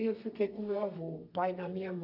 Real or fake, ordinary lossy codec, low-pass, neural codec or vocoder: fake; none; 5.4 kHz; codec, 32 kHz, 1.9 kbps, SNAC